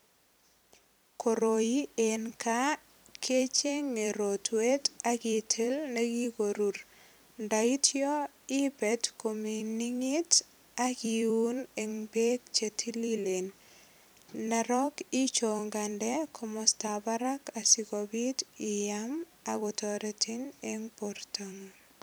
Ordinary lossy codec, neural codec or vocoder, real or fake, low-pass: none; vocoder, 44.1 kHz, 128 mel bands every 256 samples, BigVGAN v2; fake; none